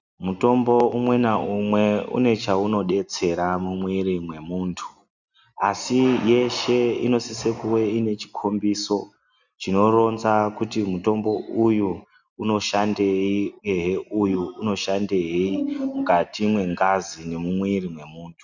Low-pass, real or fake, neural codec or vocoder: 7.2 kHz; real; none